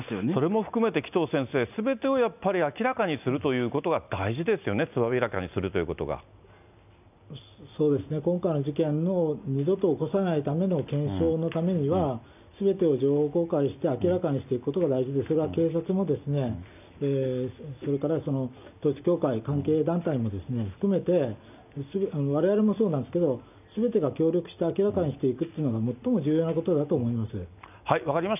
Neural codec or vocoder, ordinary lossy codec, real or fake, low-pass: none; none; real; 3.6 kHz